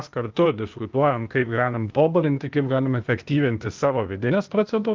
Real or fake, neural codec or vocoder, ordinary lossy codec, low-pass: fake; codec, 16 kHz, 0.8 kbps, ZipCodec; Opus, 24 kbps; 7.2 kHz